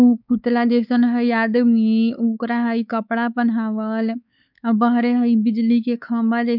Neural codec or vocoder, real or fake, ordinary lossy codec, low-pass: codec, 16 kHz, 4 kbps, X-Codec, WavLM features, trained on Multilingual LibriSpeech; fake; none; 5.4 kHz